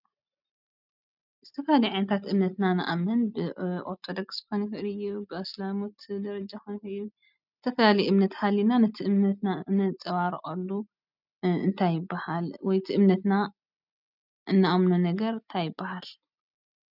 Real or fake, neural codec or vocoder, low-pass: real; none; 5.4 kHz